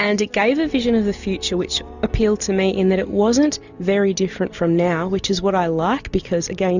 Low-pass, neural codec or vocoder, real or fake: 7.2 kHz; none; real